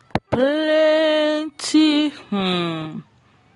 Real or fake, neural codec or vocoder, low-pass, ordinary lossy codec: real; none; 10.8 kHz; AAC, 32 kbps